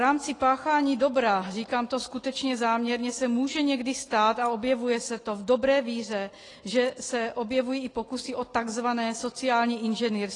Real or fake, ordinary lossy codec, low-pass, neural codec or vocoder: real; AAC, 32 kbps; 10.8 kHz; none